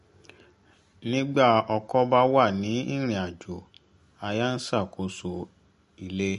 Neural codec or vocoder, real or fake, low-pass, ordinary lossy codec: none; real; 14.4 kHz; MP3, 48 kbps